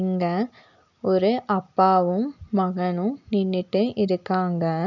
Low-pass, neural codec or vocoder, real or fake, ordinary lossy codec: 7.2 kHz; none; real; none